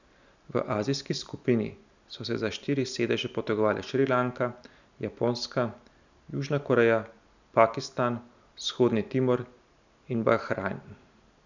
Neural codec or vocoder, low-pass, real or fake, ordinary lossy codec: none; 7.2 kHz; real; none